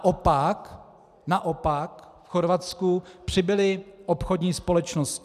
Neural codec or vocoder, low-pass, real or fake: none; 14.4 kHz; real